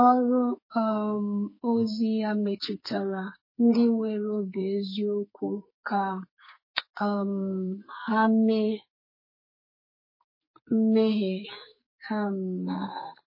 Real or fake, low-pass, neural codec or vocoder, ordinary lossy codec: fake; 5.4 kHz; codec, 44.1 kHz, 2.6 kbps, SNAC; MP3, 24 kbps